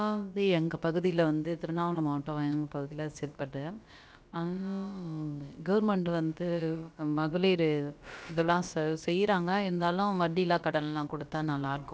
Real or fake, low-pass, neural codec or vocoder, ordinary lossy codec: fake; none; codec, 16 kHz, about 1 kbps, DyCAST, with the encoder's durations; none